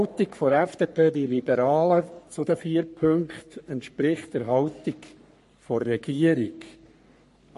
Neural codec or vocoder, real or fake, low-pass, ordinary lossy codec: codec, 44.1 kHz, 3.4 kbps, Pupu-Codec; fake; 14.4 kHz; MP3, 48 kbps